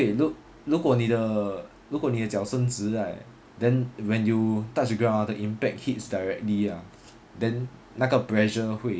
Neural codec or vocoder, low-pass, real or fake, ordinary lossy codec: none; none; real; none